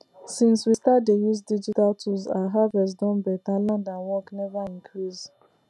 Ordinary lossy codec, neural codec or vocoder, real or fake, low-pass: none; none; real; none